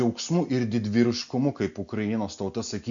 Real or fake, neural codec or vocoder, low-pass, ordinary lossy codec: real; none; 7.2 kHz; AAC, 64 kbps